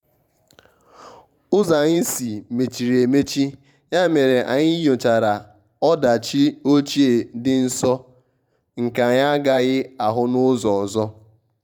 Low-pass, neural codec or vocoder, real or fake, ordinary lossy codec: 19.8 kHz; vocoder, 44.1 kHz, 128 mel bands every 512 samples, BigVGAN v2; fake; none